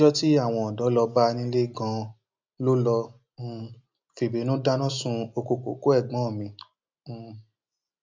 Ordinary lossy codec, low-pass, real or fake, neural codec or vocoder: MP3, 64 kbps; 7.2 kHz; real; none